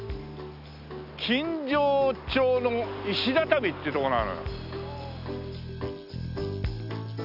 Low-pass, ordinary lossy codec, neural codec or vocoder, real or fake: 5.4 kHz; none; none; real